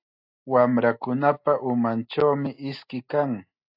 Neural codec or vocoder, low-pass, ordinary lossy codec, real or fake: none; 5.4 kHz; AAC, 48 kbps; real